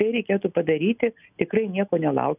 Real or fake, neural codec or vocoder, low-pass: real; none; 3.6 kHz